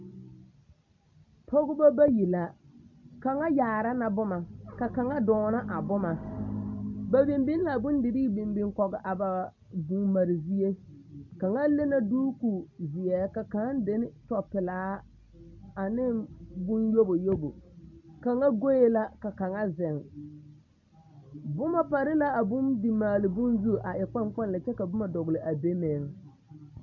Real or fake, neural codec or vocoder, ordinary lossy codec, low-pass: real; none; MP3, 48 kbps; 7.2 kHz